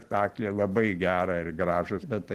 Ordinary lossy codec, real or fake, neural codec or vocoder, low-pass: Opus, 16 kbps; real; none; 14.4 kHz